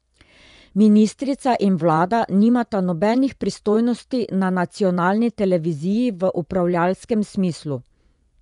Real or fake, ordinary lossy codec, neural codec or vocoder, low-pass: fake; none; vocoder, 24 kHz, 100 mel bands, Vocos; 10.8 kHz